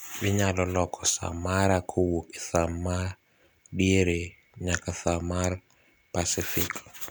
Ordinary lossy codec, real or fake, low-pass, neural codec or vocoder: none; real; none; none